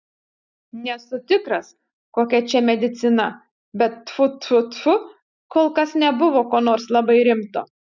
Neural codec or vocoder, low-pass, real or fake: none; 7.2 kHz; real